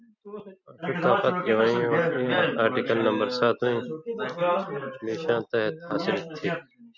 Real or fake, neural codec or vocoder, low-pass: real; none; 7.2 kHz